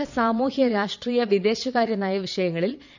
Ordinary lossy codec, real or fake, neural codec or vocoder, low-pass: none; fake; vocoder, 22.05 kHz, 80 mel bands, Vocos; 7.2 kHz